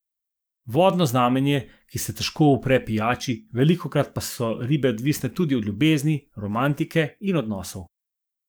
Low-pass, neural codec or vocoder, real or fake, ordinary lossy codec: none; codec, 44.1 kHz, 7.8 kbps, DAC; fake; none